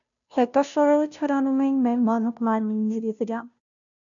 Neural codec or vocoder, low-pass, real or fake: codec, 16 kHz, 0.5 kbps, FunCodec, trained on Chinese and English, 25 frames a second; 7.2 kHz; fake